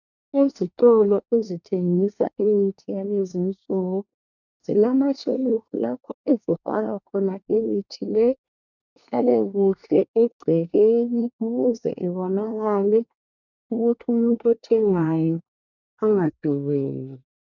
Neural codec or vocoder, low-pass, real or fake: codec, 24 kHz, 1 kbps, SNAC; 7.2 kHz; fake